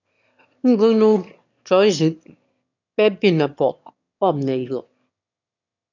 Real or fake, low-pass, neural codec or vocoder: fake; 7.2 kHz; autoencoder, 22.05 kHz, a latent of 192 numbers a frame, VITS, trained on one speaker